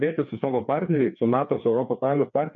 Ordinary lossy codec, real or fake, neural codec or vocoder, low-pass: MP3, 64 kbps; fake; codec, 16 kHz, 2 kbps, FreqCodec, larger model; 7.2 kHz